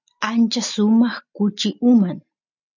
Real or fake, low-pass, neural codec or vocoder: real; 7.2 kHz; none